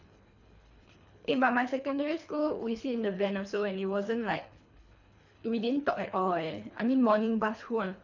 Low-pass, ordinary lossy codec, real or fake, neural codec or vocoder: 7.2 kHz; none; fake; codec, 24 kHz, 3 kbps, HILCodec